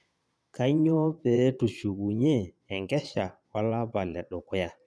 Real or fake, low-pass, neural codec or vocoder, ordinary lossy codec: fake; none; vocoder, 22.05 kHz, 80 mel bands, Vocos; none